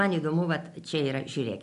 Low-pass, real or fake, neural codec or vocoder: 10.8 kHz; real; none